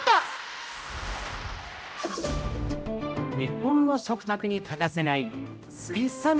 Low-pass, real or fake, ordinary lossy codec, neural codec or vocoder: none; fake; none; codec, 16 kHz, 0.5 kbps, X-Codec, HuBERT features, trained on general audio